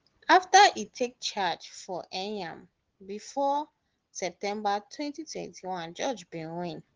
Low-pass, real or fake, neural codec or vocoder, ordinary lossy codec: 7.2 kHz; real; none; Opus, 16 kbps